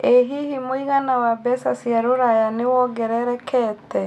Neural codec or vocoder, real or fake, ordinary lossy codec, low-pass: none; real; none; 14.4 kHz